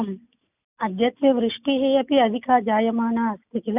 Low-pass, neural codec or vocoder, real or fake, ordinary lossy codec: 3.6 kHz; none; real; none